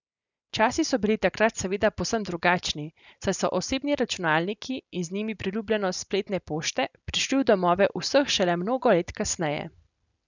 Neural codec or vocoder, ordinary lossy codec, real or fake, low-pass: none; none; real; 7.2 kHz